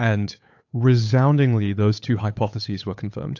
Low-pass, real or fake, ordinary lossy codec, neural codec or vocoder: 7.2 kHz; fake; AAC, 48 kbps; codec, 16 kHz, 8 kbps, FunCodec, trained on Chinese and English, 25 frames a second